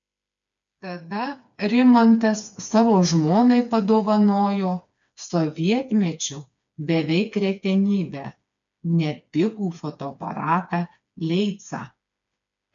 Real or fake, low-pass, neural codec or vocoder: fake; 7.2 kHz; codec, 16 kHz, 4 kbps, FreqCodec, smaller model